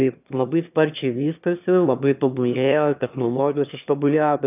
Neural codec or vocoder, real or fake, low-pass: autoencoder, 22.05 kHz, a latent of 192 numbers a frame, VITS, trained on one speaker; fake; 3.6 kHz